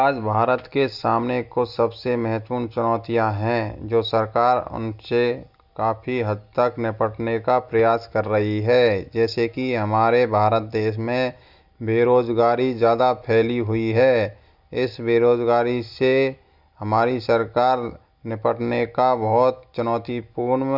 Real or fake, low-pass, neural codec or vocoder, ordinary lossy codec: real; 5.4 kHz; none; Opus, 64 kbps